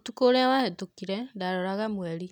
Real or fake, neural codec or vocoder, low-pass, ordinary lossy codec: real; none; 19.8 kHz; none